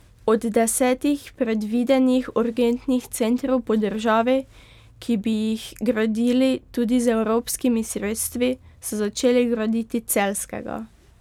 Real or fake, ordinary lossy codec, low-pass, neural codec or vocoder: real; none; 19.8 kHz; none